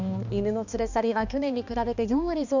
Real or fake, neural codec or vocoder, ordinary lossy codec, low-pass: fake; codec, 16 kHz, 2 kbps, X-Codec, HuBERT features, trained on balanced general audio; none; 7.2 kHz